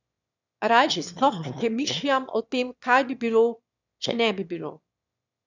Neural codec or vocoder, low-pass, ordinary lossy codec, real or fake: autoencoder, 22.05 kHz, a latent of 192 numbers a frame, VITS, trained on one speaker; 7.2 kHz; none; fake